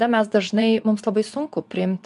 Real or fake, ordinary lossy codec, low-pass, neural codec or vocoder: fake; MP3, 96 kbps; 10.8 kHz; vocoder, 24 kHz, 100 mel bands, Vocos